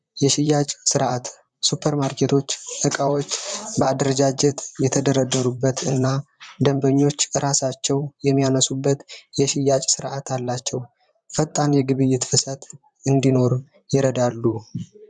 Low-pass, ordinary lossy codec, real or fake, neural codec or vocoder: 9.9 kHz; MP3, 96 kbps; fake; vocoder, 44.1 kHz, 128 mel bands, Pupu-Vocoder